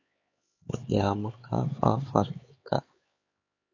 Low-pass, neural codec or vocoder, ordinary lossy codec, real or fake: 7.2 kHz; codec, 16 kHz, 4 kbps, X-Codec, HuBERT features, trained on LibriSpeech; AAC, 32 kbps; fake